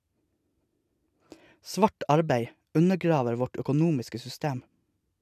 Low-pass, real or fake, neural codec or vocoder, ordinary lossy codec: 14.4 kHz; real; none; none